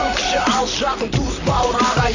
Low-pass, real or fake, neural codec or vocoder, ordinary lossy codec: 7.2 kHz; fake; vocoder, 44.1 kHz, 128 mel bands, Pupu-Vocoder; none